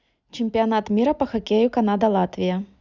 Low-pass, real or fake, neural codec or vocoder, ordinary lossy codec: 7.2 kHz; real; none; Opus, 64 kbps